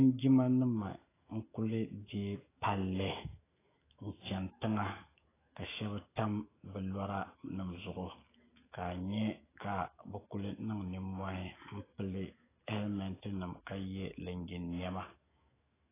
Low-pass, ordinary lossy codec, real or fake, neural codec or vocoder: 3.6 kHz; AAC, 16 kbps; fake; autoencoder, 48 kHz, 128 numbers a frame, DAC-VAE, trained on Japanese speech